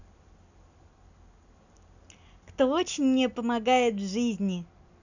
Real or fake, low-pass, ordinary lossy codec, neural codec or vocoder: real; 7.2 kHz; none; none